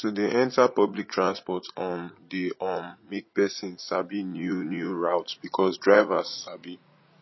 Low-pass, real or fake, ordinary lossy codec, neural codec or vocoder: 7.2 kHz; fake; MP3, 24 kbps; vocoder, 44.1 kHz, 80 mel bands, Vocos